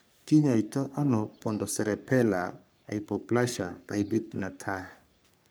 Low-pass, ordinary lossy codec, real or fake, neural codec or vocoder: none; none; fake; codec, 44.1 kHz, 3.4 kbps, Pupu-Codec